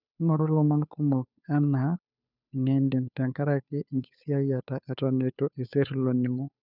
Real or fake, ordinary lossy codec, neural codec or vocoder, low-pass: fake; none; codec, 16 kHz, 2 kbps, FunCodec, trained on Chinese and English, 25 frames a second; 5.4 kHz